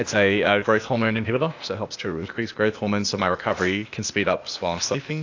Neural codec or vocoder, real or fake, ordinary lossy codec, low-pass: codec, 16 kHz, 0.8 kbps, ZipCodec; fake; AAC, 48 kbps; 7.2 kHz